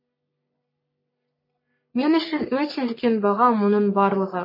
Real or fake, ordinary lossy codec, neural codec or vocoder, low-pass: fake; MP3, 24 kbps; codec, 44.1 kHz, 3.4 kbps, Pupu-Codec; 5.4 kHz